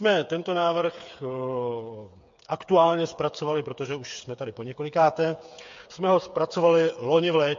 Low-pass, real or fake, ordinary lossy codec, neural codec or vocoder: 7.2 kHz; fake; MP3, 48 kbps; codec, 16 kHz, 8 kbps, FreqCodec, smaller model